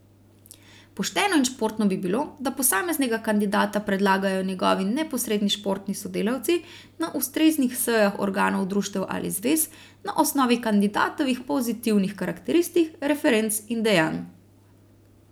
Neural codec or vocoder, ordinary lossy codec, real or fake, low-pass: none; none; real; none